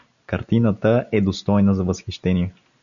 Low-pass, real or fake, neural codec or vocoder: 7.2 kHz; real; none